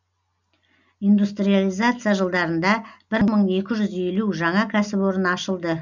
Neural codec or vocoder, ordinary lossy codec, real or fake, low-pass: none; none; real; 7.2 kHz